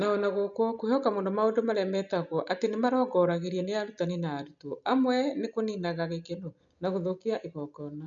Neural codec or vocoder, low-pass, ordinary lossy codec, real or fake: none; 7.2 kHz; none; real